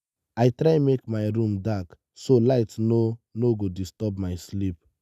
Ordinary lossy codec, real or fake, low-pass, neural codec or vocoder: none; real; 14.4 kHz; none